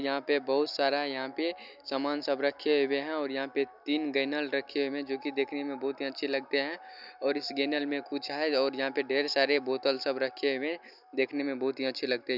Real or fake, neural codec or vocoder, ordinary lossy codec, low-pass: real; none; none; 5.4 kHz